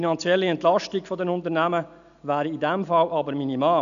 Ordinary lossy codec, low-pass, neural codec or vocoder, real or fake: none; 7.2 kHz; none; real